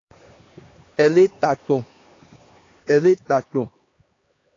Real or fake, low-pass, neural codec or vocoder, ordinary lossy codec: fake; 7.2 kHz; codec, 16 kHz, 2 kbps, X-Codec, HuBERT features, trained on LibriSpeech; AAC, 32 kbps